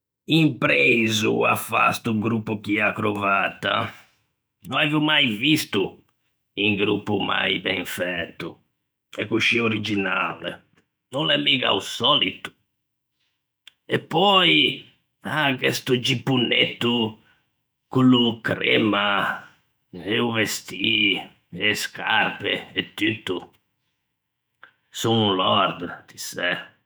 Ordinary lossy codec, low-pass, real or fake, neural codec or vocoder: none; none; fake; autoencoder, 48 kHz, 128 numbers a frame, DAC-VAE, trained on Japanese speech